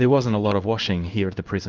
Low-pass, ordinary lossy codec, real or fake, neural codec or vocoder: 7.2 kHz; Opus, 24 kbps; fake; codec, 16 kHz in and 24 kHz out, 1 kbps, XY-Tokenizer